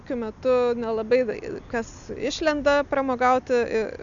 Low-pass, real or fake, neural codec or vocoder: 7.2 kHz; real; none